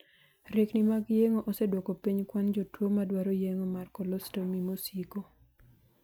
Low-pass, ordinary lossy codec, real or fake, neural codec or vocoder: none; none; real; none